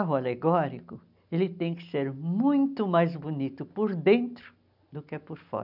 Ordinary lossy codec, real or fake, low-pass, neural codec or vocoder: none; real; 5.4 kHz; none